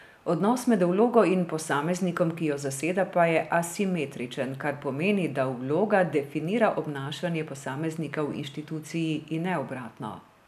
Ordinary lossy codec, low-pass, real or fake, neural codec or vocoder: none; 14.4 kHz; real; none